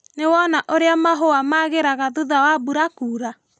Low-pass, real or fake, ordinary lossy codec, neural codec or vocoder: 10.8 kHz; real; none; none